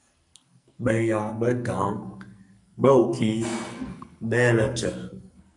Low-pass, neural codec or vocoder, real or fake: 10.8 kHz; codec, 44.1 kHz, 2.6 kbps, SNAC; fake